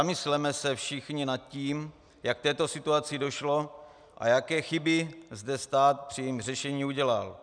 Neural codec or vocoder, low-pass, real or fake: none; 9.9 kHz; real